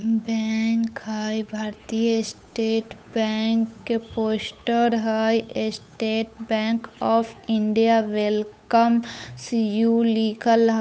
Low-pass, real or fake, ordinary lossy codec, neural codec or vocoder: none; fake; none; codec, 16 kHz, 8 kbps, FunCodec, trained on Chinese and English, 25 frames a second